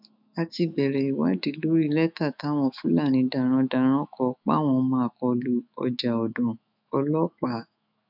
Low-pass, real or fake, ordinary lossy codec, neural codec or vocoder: 5.4 kHz; fake; none; autoencoder, 48 kHz, 128 numbers a frame, DAC-VAE, trained on Japanese speech